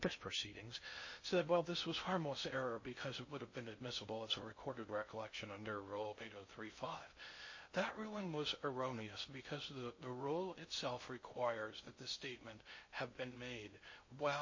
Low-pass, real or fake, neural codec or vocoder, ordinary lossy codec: 7.2 kHz; fake; codec, 16 kHz in and 24 kHz out, 0.6 kbps, FocalCodec, streaming, 2048 codes; MP3, 32 kbps